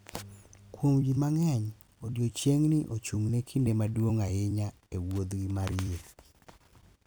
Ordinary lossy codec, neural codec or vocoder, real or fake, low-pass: none; vocoder, 44.1 kHz, 128 mel bands every 256 samples, BigVGAN v2; fake; none